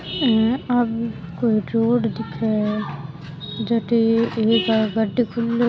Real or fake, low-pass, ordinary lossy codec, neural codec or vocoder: real; none; none; none